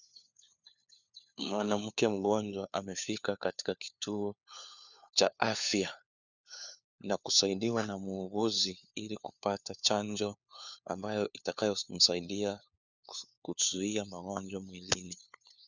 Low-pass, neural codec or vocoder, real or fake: 7.2 kHz; codec, 16 kHz, 4 kbps, FunCodec, trained on LibriTTS, 50 frames a second; fake